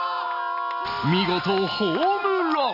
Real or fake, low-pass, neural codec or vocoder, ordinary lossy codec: real; 5.4 kHz; none; none